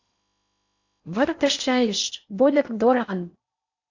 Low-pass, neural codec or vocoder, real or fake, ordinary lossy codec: 7.2 kHz; codec, 16 kHz in and 24 kHz out, 0.8 kbps, FocalCodec, streaming, 65536 codes; fake; AAC, 48 kbps